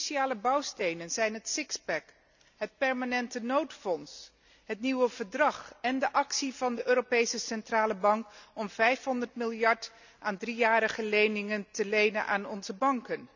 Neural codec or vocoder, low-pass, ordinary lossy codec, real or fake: none; 7.2 kHz; none; real